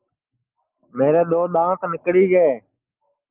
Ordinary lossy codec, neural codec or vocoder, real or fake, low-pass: Opus, 32 kbps; none; real; 3.6 kHz